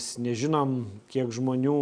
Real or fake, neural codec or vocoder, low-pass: real; none; 9.9 kHz